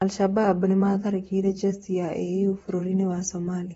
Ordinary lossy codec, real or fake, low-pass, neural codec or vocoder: AAC, 24 kbps; fake; 19.8 kHz; vocoder, 44.1 kHz, 128 mel bands, Pupu-Vocoder